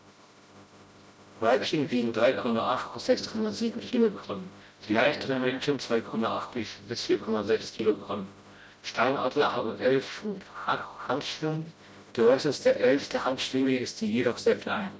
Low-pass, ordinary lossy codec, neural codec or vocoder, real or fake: none; none; codec, 16 kHz, 0.5 kbps, FreqCodec, smaller model; fake